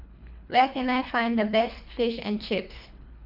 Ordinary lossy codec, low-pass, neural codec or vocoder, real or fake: none; 5.4 kHz; codec, 24 kHz, 3 kbps, HILCodec; fake